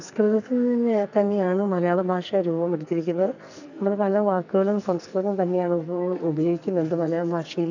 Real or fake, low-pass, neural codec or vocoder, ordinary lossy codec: fake; 7.2 kHz; codec, 44.1 kHz, 2.6 kbps, SNAC; none